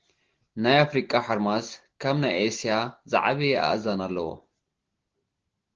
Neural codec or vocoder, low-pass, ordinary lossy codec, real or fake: none; 7.2 kHz; Opus, 16 kbps; real